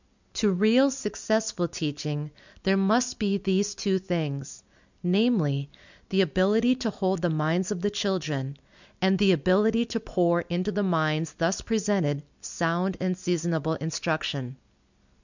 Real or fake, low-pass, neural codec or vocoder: real; 7.2 kHz; none